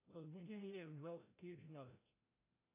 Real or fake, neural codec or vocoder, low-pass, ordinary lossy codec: fake; codec, 16 kHz, 0.5 kbps, FreqCodec, larger model; 3.6 kHz; AAC, 32 kbps